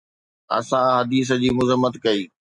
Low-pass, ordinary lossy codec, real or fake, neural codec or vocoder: 10.8 kHz; MP3, 64 kbps; real; none